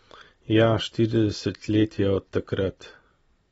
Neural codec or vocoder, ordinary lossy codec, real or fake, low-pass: none; AAC, 24 kbps; real; 19.8 kHz